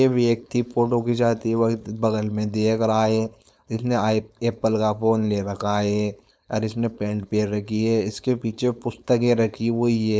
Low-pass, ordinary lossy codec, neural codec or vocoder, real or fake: none; none; codec, 16 kHz, 4.8 kbps, FACodec; fake